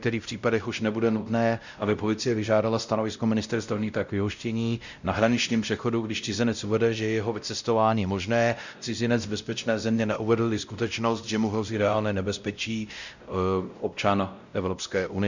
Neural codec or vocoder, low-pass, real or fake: codec, 16 kHz, 0.5 kbps, X-Codec, WavLM features, trained on Multilingual LibriSpeech; 7.2 kHz; fake